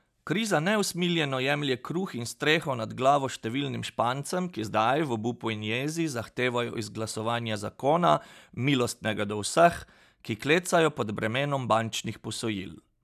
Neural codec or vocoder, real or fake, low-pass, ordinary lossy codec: none; real; 14.4 kHz; none